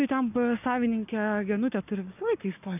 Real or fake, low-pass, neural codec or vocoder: fake; 3.6 kHz; codec, 44.1 kHz, 7.8 kbps, Pupu-Codec